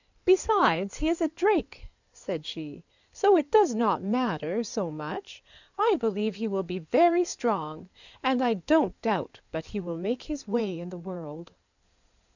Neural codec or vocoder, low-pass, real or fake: codec, 16 kHz in and 24 kHz out, 2.2 kbps, FireRedTTS-2 codec; 7.2 kHz; fake